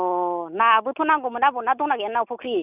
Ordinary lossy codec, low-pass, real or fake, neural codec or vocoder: none; 3.6 kHz; real; none